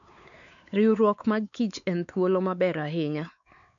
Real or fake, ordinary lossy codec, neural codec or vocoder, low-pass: fake; none; codec, 16 kHz, 4 kbps, X-Codec, WavLM features, trained on Multilingual LibriSpeech; 7.2 kHz